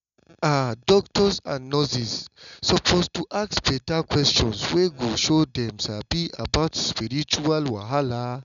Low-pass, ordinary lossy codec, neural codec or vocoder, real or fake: 7.2 kHz; none; none; real